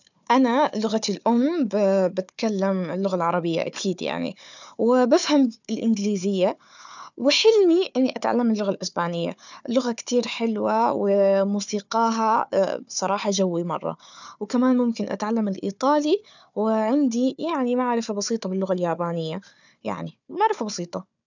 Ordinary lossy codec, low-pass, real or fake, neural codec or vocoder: none; 7.2 kHz; fake; codec, 16 kHz, 4 kbps, FunCodec, trained on Chinese and English, 50 frames a second